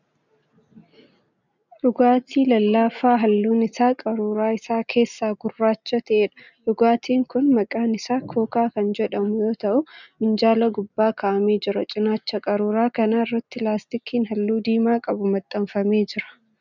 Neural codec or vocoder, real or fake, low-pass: none; real; 7.2 kHz